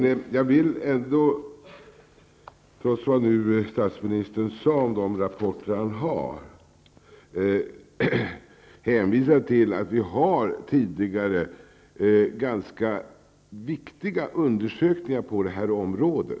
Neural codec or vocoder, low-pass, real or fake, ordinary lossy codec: none; none; real; none